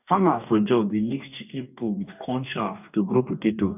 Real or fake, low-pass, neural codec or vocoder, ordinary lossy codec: fake; 3.6 kHz; codec, 44.1 kHz, 2.6 kbps, DAC; none